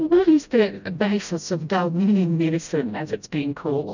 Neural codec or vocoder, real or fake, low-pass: codec, 16 kHz, 0.5 kbps, FreqCodec, smaller model; fake; 7.2 kHz